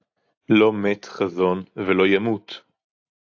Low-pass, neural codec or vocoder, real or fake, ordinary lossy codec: 7.2 kHz; none; real; AAC, 48 kbps